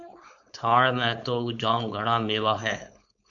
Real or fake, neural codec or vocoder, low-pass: fake; codec, 16 kHz, 4.8 kbps, FACodec; 7.2 kHz